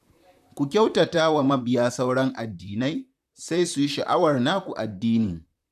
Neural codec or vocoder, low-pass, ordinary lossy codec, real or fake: vocoder, 44.1 kHz, 128 mel bands, Pupu-Vocoder; 14.4 kHz; none; fake